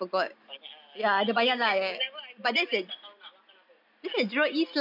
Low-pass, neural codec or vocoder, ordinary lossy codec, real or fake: 5.4 kHz; none; none; real